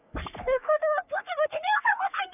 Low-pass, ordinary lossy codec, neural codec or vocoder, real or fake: 3.6 kHz; none; codec, 44.1 kHz, 3.4 kbps, Pupu-Codec; fake